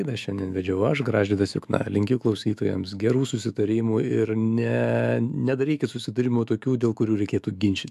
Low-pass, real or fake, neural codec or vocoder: 14.4 kHz; fake; autoencoder, 48 kHz, 128 numbers a frame, DAC-VAE, trained on Japanese speech